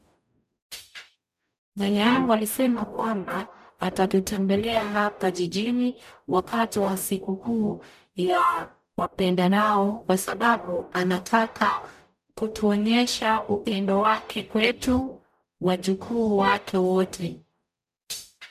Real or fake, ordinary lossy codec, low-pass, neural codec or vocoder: fake; none; 14.4 kHz; codec, 44.1 kHz, 0.9 kbps, DAC